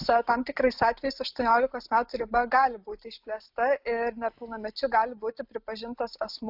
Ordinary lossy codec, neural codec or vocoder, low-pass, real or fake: AAC, 48 kbps; none; 5.4 kHz; real